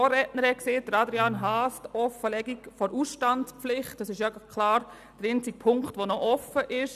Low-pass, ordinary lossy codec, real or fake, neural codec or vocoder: 14.4 kHz; none; real; none